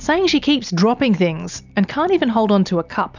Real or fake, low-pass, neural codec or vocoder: real; 7.2 kHz; none